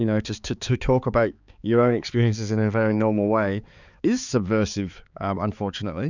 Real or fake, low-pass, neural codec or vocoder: fake; 7.2 kHz; codec, 16 kHz, 4 kbps, X-Codec, HuBERT features, trained on balanced general audio